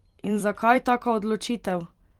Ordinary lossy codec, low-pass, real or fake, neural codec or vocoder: Opus, 24 kbps; 19.8 kHz; fake; vocoder, 44.1 kHz, 128 mel bands every 256 samples, BigVGAN v2